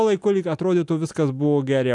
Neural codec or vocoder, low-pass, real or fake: none; 10.8 kHz; real